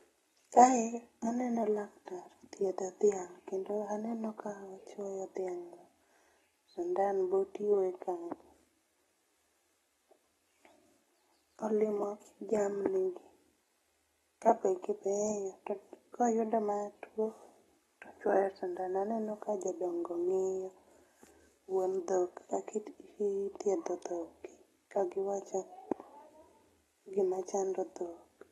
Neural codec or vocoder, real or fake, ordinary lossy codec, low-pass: none; real; AAC, 32 kbps; 19.8 kHz